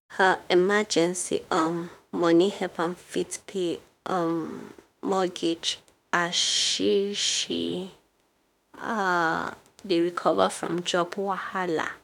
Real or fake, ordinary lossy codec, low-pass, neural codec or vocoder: fake; none; 19.8 kHz; autoencoder, 48 kHz, 32 numbers a frame, DAC-VAE, trained on Japanese speech